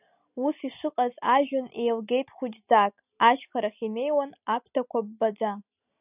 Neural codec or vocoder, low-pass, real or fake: none; 3.6 kHz; real